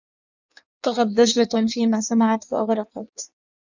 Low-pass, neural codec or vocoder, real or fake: 7.2 kHz; codec, 16 kHz in and 24 kHz out, 1.1 kbps, FireRedTTS-2 codec; fake